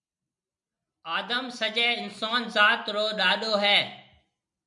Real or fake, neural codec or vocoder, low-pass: real; none; 10.8 kHz